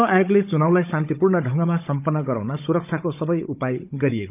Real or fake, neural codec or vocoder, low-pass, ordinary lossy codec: fake; codec, 16 kHz, 16 kbps, FunCodec, trained on Chinese and English, 50 frames a second; 3.6 kHz; none